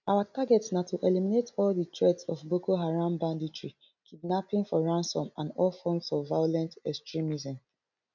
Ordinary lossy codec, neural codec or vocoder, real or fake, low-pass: none; none; real; 7.2 kHz